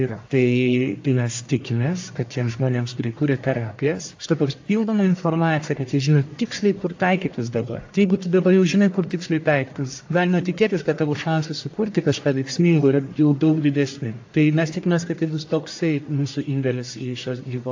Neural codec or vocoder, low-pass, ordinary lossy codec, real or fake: codec, 44.1 kHz, 1.7 kbps, Pupu-Codec; 7.2 kHz; AAC, 48 kbps; fake